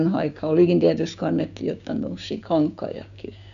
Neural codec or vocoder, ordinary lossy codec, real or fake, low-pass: codec, 16 kHz, 6 kbps, DAC; AAC, 96 kbps; fake; 7.2 kHz